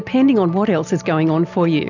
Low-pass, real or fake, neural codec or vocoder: 7.2 kHz; real; none